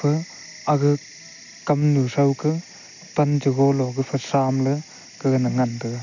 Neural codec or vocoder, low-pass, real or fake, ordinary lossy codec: none; 7.2 kHz; real; none